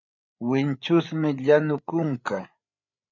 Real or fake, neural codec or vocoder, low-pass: fake; codec, 16 kHz, 8 kbps, FreqCodec, larger model; 7.2 kHz